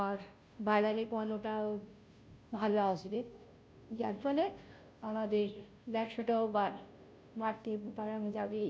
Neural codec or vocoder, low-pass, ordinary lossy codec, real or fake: codec, 16 kHz, 0.5 kbps, FunCodec, trained on Chinese and English, 25 frames a second; none; none; fake